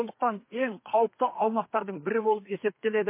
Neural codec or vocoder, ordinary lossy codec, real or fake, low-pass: codec, 32 kHz, 1.9 kbps, SNAC; MP3, 24 kbps; fake; 3.6 kHz